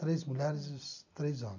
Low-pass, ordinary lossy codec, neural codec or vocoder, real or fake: 7.2 kHz; none; none; real